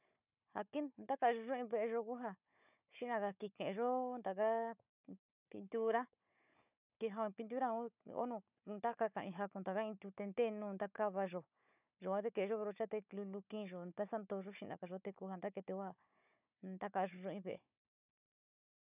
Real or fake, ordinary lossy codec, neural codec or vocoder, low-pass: fake; none; codec, 16 kHz, 4 kbps, FunCodec, trained on Chinese and English, 50 frames a second; 3.6 kHz